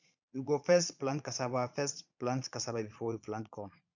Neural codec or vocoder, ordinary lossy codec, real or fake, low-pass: vocoder, 22.05 kHz, 80 mel bands, Vocos; none; fake; 7.2 kHz